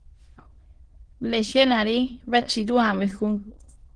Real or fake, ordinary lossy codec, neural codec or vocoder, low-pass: fake; Opus, 16 kbps; autoencoder, 22.05 kHz, a latent of 192 numbers a frame, VITS, trained on many speakers; 9.9 kHz